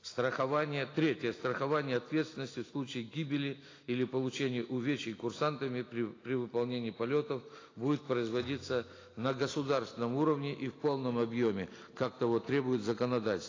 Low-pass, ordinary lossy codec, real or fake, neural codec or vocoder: 7.2 kHz; AAC, 32 kbps; real; none